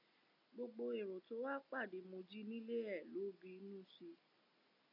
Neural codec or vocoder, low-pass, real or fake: none; 5.4 kHz; real